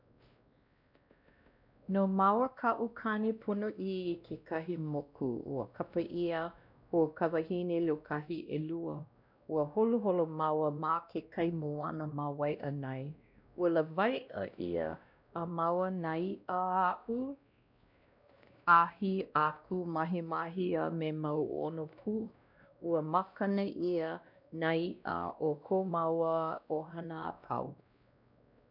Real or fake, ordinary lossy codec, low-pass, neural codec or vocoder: fake; none; 5.4 kHz; codec, 16 kHz, 1 kbps, X-Codec, WavLM features, trained on Multilingual LibriSpeech